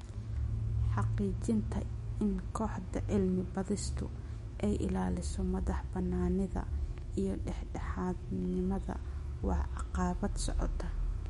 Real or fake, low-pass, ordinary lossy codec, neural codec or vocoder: fake; 19.8 kHz; MP3, 48 kbps; autoencoder, 48 kHz, 128 numbers a frame, DAC-VAE, trained on Japanese speech